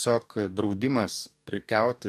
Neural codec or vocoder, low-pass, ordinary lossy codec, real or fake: codec, 44.1 kHz, 2.6 kbps, DAC; 14.4 kHz; AAC, 96 kbps; fake